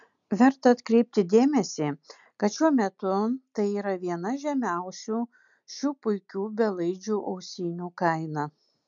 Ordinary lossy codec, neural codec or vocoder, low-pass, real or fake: MP3, 96 kbps; none; 7.2 kHz; real